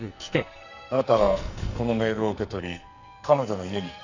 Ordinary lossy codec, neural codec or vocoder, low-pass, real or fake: none; codec, 44.1 kHz, 2.6 kbps, SNAC; 7.2 kHz; fake